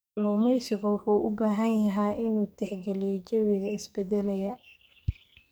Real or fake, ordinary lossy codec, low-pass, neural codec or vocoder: fake; none; none; codec, 44.1 kHz, 2.6 kbps, SNAC